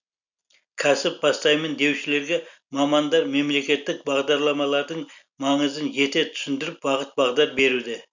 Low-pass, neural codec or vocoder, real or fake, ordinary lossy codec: 7.2 kHz; none; real; none